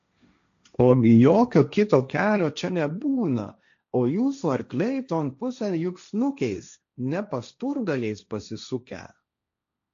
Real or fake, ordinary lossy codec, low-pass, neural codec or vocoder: fake; AAC, 64 kbps; 7.2 kHz; codec, 16 kHz, 1.1 kbps, Voila-Tokenizer